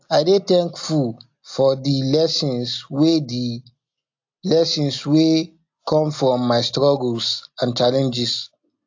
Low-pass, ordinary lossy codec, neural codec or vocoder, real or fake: 7.2 kHz; AAC, 48 kbps; none; real